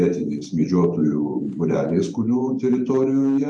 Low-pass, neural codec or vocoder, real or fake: 9.9 kHz; vocoder, 44.1 kHz, 128 mel bands every 512 samples, BigVGAN v2; fake